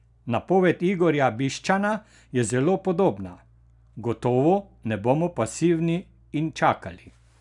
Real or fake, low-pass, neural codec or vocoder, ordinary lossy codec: real; 10.8 kHz; none; none